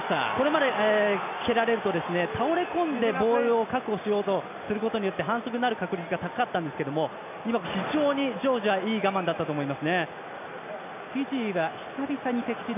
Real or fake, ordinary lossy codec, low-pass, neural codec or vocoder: real; AAC, 32 kbps; 3.6 kHz; none